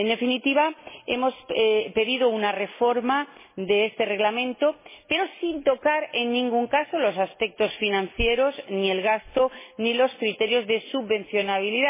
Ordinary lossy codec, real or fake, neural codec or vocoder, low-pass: MP3, 16 kbps; real; none; 3.6 kHz